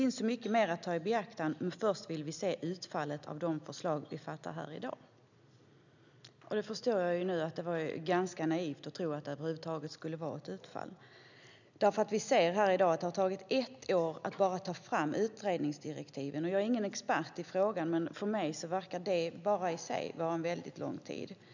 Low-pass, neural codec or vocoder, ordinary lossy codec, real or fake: 7.2 kHz; none; none; real